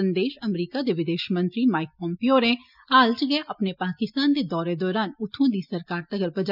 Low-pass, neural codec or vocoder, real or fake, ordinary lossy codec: 5.4 kHz; none; real; none